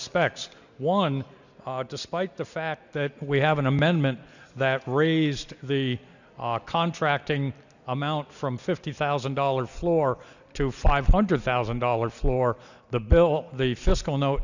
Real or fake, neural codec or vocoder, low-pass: real; none; 7.2 kHz